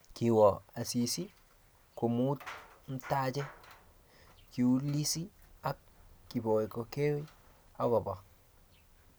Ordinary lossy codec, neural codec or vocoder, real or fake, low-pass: none; none; real; none